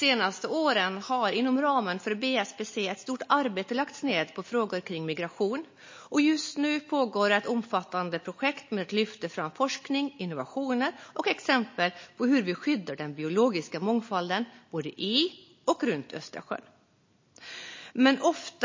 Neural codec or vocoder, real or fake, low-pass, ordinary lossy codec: none; real; 7.2 kHz; MP3, 32 kbps